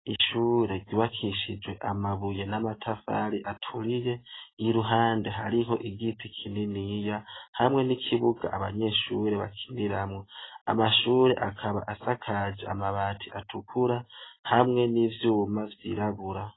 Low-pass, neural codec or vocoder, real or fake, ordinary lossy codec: 7.2 kHz; none; real; AAC, 16 kbps